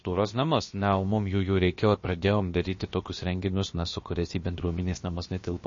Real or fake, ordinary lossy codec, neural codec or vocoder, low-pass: fake; MP3, 32 kbps; codec, 16 kHz, about 1 kbps, DyCAST, with the encoder's durations; 7.2 kHz